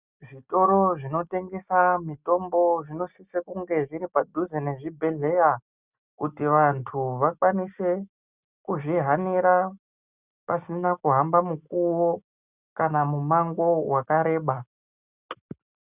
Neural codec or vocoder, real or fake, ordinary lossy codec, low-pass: none; real; Opus, 64 kbps; 3.6 kHz